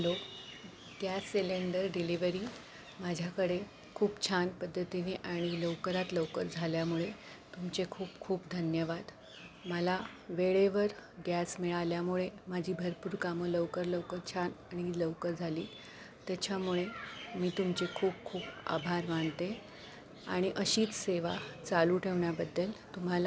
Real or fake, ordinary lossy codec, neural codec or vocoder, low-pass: real; none; none; none